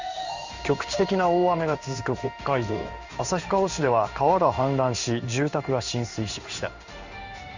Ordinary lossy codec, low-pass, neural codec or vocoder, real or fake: Opus, 64 kbps; 7.2 kHz; codec, 16 kHz in and 24 kHz out, 1 kbps, XY-Tokenizer; fake